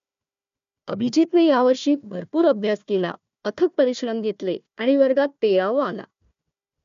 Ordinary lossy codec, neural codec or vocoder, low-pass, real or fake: MP3, 64 kbps; codec, 16 kHz, 1 kbps, FunCodec, trained on Chinese and English, 50 frames a second; 7.2 kHz; fake